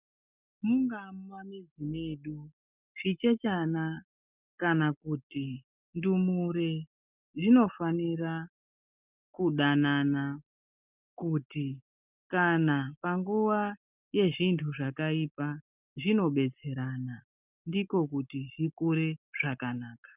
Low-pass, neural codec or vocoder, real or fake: 3.6 kHz; none; real